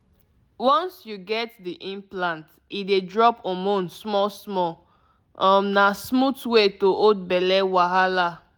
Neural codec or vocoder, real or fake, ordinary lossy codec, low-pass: none; real; none; none